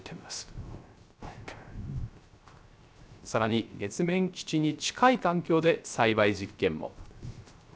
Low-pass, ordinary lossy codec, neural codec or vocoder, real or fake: none; none; codec, 16 kHz, 0.3 kbps, FocalCodec; fake